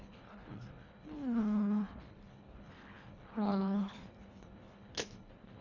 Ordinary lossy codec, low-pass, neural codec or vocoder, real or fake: none; 7.2 kHz; codec, 24 kHz, 1.5 kbps, HILCodec; fake